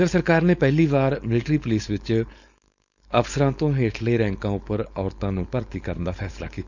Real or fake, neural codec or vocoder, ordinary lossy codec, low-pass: fake; codec, 16 kHz, 4.8 kbps, FACodec; none; 7.2 kHz